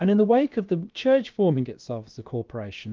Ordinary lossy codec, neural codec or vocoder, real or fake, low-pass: Opus, 32 kbps; codec, 16 kHz, about 1 kbps, DyCAST, with the encoder's durations; fake; 7.2 kHz